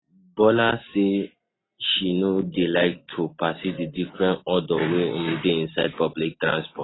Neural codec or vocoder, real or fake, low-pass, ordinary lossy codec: none; real; 7.2 kHz; AAC, 16 kbps